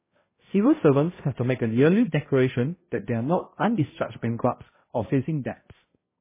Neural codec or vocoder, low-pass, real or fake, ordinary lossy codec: codec, 16 kHz, 0.5 kbps, X-Codec, HuBERT features, trained on balanced general audio; 3.6 kHz; fake; MP3, 16 kbps